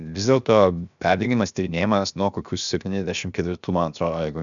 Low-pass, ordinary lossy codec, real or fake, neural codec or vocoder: 7.2 kHz; MP3, 96 kbps; fake; codec, 16 kHz, 0.7 kbps, FocalCodec